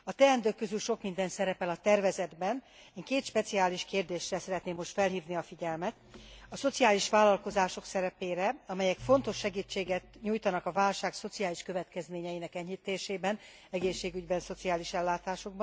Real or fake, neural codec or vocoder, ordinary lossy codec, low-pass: real; none; none; none